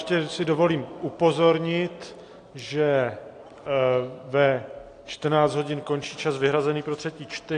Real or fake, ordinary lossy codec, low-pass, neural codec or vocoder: real; AAC, 48 kbps; 9.9 kHz; none